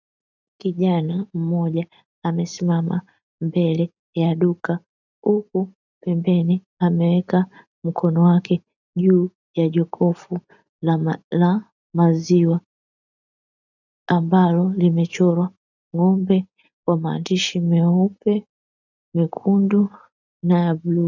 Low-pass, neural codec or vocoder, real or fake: 7.2 kHz; none; real